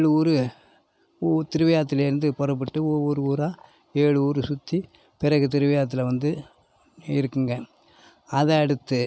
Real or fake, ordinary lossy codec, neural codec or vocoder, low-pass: real; none; none; none